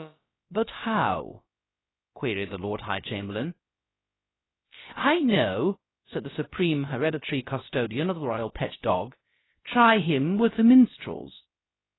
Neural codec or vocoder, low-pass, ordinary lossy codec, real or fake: codec, 16 kHz, about 1 kbps, DyCAST, with the encoder's durations; 7.2 kHz; AAC, 16 kbps; fake